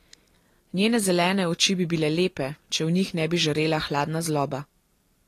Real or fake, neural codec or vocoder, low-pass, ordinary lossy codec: real; none; 14.4 kHz; AAC, 48 kbps